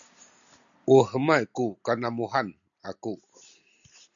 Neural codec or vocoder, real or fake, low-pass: none; real; 7.2 kHz